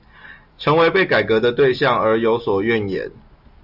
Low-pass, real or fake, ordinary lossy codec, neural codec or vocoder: 5.4 kHz; real; AAC, 48 kbps; none